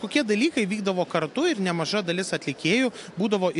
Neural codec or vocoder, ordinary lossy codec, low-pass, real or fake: none; AAC, 64 kbps; 10.8 kHz; real